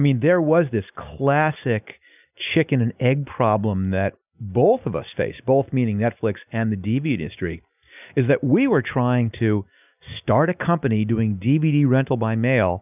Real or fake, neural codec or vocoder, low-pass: real; none; 3.6 kHz